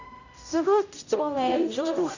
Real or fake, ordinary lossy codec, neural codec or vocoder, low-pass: fake; none; codec, 16 kHz, 0.5 kbps, X-Codec, HuBERT features, trained on general audio; 7.2 kHz